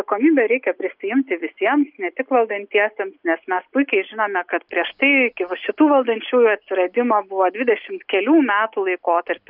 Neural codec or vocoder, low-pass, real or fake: none; 5.4 kHz; real